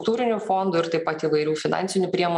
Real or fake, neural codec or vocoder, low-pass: real; none; 10.8 kHz